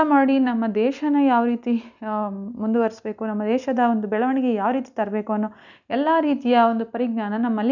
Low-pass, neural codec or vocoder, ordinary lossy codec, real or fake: 7.2 kHz; none; none; real